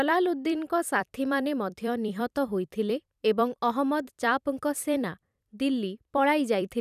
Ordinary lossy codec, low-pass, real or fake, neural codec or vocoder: none; 14.4 kHz; fake; vocoder, 44.1 kHz, 128 mel bands every 256 samples, BigVGAN v2